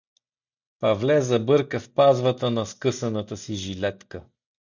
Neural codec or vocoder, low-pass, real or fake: none; 7.2 kHz; real